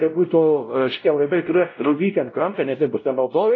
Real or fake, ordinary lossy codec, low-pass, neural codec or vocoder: fake; AAC, 32 kbps; 7.2 kHz; codec, 16 kHz, 0.5 kbps, X-Codec, WavLM features, trained on Multilingual LibriSpeech